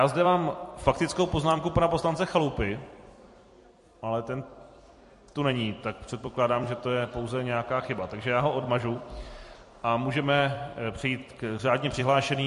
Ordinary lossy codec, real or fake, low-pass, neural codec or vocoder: MP3, 48 kbps; fake; 14.4 kHz; vocoder, 44.1 kHz, 128 mel bands every 256 samples, BigVGAN v2